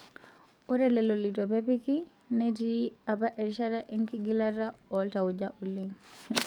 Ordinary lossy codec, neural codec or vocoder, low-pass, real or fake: none; codec, 44.1 kHz, 7.8 kbps, DAC; none; fake